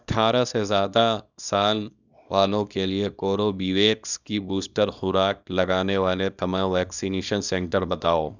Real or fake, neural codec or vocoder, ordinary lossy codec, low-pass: fake; codec, 24 kHz, 0.9 kbps, WavTokenizer, small release; none; 7.2 kHz